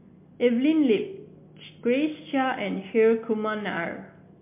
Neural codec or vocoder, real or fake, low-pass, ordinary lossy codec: none; real; 3.6 kHz; MP3, 24 kbps